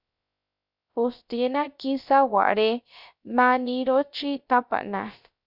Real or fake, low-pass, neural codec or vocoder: fake; 5.4 kHz; codec, 16 kHz, 0.3 kbps, FocalCodec